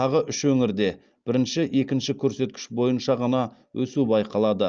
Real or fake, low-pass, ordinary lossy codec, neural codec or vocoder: real; 7.2 kHz; Opus, 24 kbps; none